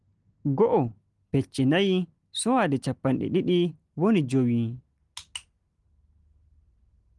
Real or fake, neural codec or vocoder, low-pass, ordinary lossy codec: real; none; 10.8 kHz; Opus, 24 kbps